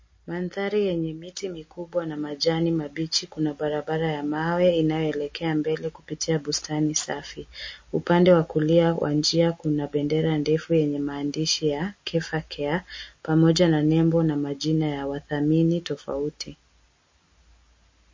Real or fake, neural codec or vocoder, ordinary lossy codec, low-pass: real; none; MP3, 32 kbps; 7.2 kHz